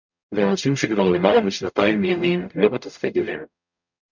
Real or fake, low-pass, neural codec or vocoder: fake; 7.2 kHz; codec, 44.1 kHz, 0.9 kbps, DAC